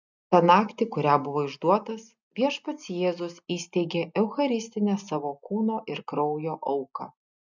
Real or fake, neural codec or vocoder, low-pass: real; none; 7.2 kHz